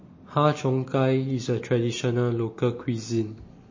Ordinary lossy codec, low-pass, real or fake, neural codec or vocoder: MP3, 32 kbps; 7.2 kHz; real; none